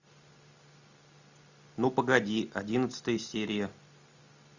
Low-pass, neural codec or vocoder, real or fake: 7.2 kHz; none; real